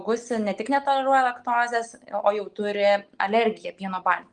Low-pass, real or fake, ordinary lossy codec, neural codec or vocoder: 9.9 kHz; real; Opus, 24 kbps; none